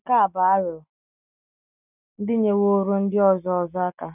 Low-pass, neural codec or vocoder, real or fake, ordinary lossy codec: 3.6 kHz; none; real; none